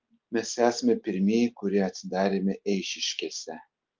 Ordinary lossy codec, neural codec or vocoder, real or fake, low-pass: Opus, 24 kbps; none; real; 7.2 kHz